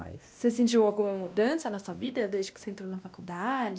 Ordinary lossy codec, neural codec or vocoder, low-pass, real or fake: none; codec, 16 kHz, 1 kbps, X-Codec, WavLM features, trained on Multilingual LibriSpeech; none; fake